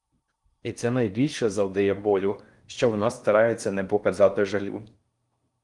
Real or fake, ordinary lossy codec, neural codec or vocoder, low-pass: fake; Opus, 32 kbps; codec, 16 kHz in and 24 kHz out, 0.6 kbps, FocalCodec, streaming, 4096 codes; 10.8 kHz